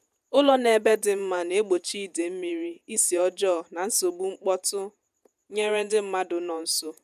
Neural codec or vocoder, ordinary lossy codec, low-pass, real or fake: vocoder, 48 kHz, 128 mel bands, Vocos; none; 14.4 kHz; fake